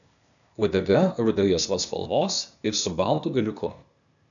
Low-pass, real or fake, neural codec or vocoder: 7.2 kHz; fake; codec, 16 kHz, 0.8 kbps, ZipCodec